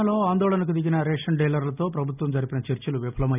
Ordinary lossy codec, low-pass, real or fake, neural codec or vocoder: none; 3.6 kHz; real; none